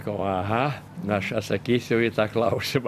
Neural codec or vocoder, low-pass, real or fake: none; 14.4 kHz; real